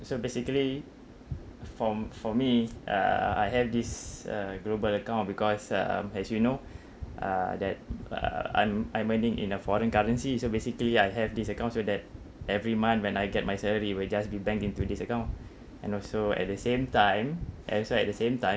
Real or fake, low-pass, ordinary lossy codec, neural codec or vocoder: real; none; none; none